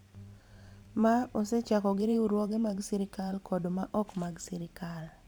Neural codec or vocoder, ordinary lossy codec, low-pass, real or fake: vocoder, 44.1 kHz, 128 mel bands every 512 samples, BigVGAN v2; none; none; fake